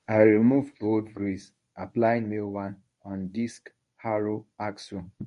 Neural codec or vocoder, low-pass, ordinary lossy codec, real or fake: codec, 24 kHz, 0.9 kbps, WavTokenizer, medium speech release version 1; 10.8 kHz; MP3, 48 kbps; fake